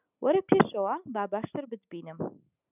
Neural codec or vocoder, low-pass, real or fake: none; 3.6 kHz; real